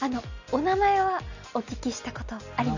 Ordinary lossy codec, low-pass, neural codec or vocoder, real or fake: none; 7.2 kHz; none; real